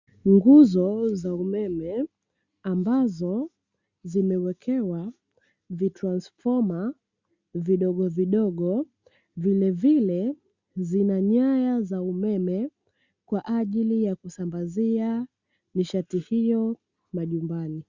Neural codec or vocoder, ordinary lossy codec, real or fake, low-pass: none; Opus, 64 kbps; real; 7.2 kHz